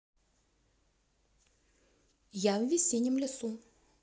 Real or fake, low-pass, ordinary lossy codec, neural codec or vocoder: real; none; none; none